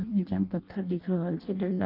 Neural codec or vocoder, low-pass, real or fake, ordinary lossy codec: codec, 16 kHz in and 24 kHz out, 0.6 kbps, FireRedTTS-2 codec; 5.4 kHz; fake; Opus, 24 kbps